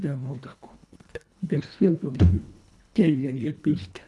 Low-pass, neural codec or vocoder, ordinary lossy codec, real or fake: none; codec, 24 kHz, 1.5 kbps, HILCodec; none; fake